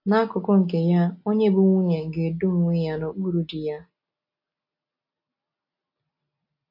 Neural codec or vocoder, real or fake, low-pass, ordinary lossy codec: none; real; 5.4 kHz; MP3, 32 kbps